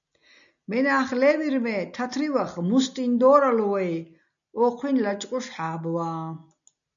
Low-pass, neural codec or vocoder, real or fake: 7.2 kHz; none; real